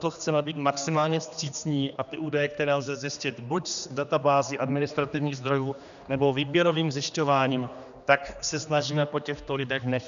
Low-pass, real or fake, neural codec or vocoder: 7.2 kHz; fake; codec, 16 kHz, 2 kbps, X-Codec, HuBERT features, trained on general audio